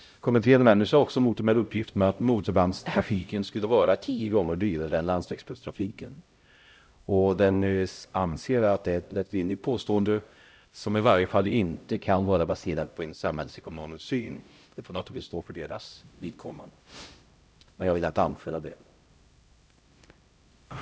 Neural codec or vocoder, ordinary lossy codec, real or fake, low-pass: codec, 16 kHz, 0.5 kbps, X-Codec, HuBERT features, trained on LibriSpeech; none; fake; none